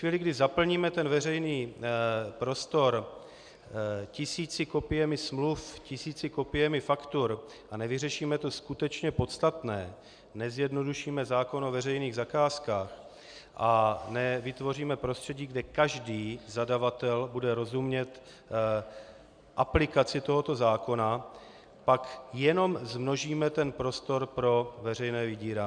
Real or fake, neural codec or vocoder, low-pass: real; none; 9.9 kHz